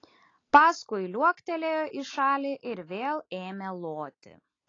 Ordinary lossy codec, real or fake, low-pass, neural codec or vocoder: AAC, 32 kbps; real; 7.2 kHz; none